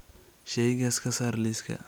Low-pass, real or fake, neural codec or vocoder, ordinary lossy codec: none; real; none; none